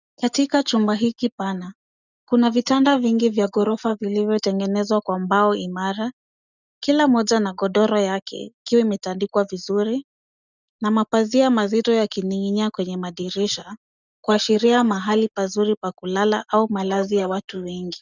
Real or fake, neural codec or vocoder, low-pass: real; none; 7.2 kHz